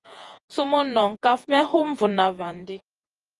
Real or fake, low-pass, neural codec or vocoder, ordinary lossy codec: fake; 10.8 kHz; vocoder, 48 kHz, 128 mel bands, Vocos; Opus, 32 kbps